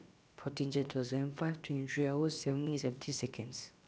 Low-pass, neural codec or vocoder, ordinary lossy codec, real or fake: none; codec, 16 kHz, about 1 kbps, DyCAST, with the encoder's durations; none; fake